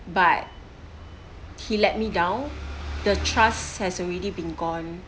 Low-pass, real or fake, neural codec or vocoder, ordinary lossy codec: none; real; none; none